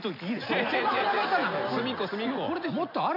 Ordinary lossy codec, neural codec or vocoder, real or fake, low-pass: MP3, 48 kbps; none; real; 5.4 kHz